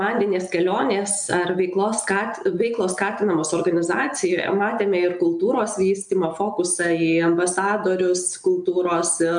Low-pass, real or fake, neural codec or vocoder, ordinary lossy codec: 9.9 kHz; real; none; MP3, 96 kbps